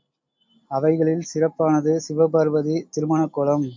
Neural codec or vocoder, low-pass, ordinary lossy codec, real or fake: none; 7.2 kHz; MP3, 64 kbps; real